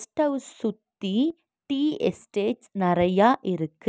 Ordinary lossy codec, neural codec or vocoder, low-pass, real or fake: none; none; none; real